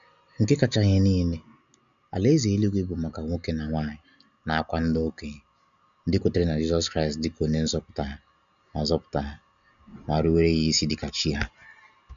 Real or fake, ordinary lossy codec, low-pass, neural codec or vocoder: real; none; 7.2 kHz; none